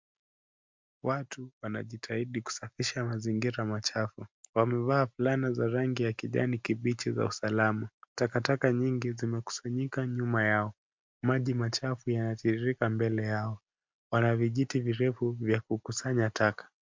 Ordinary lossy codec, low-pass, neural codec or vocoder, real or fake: MP3, 64 kbps; 7.2 kHz; none; real